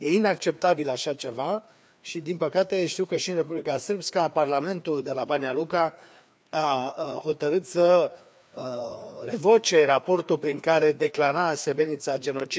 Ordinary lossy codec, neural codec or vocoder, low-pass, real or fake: none; codec, 16 kHz, 2 kbps, FreqCodec, larger model; none; fake